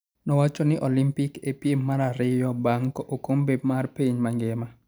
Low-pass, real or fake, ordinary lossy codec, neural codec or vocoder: none; real; none; none